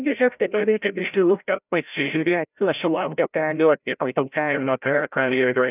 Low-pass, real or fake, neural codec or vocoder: 3.6 kHz; fake; codec, 16 kHz, 0.5 kbps, FreqCodec, larger model